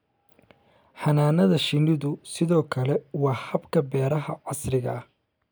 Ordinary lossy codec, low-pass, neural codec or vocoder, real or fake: none; none; none; real